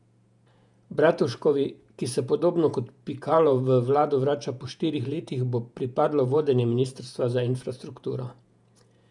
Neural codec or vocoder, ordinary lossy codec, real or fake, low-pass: vocoder, 48 kHz, 128 mel bands, Vocos; none; fake; 10.8 kHz